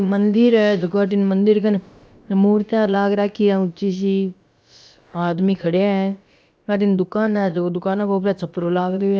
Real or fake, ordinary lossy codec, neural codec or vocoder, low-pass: fake; none; codec, 16 kHz, about 1 kbps, DyCAST, with the encoder's durations; none